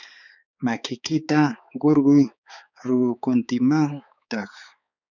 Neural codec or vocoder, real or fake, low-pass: codec, 16 kHz, 4 kbps, X-Codec, HuBERT features, trained on balanced general audio; fake; 7.2 kHz